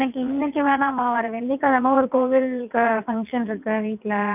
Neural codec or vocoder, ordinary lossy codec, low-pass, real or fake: vocoder, 22.05 kHz, 80 mel bands, WaveNeXt; none; 3.6 kHz; fake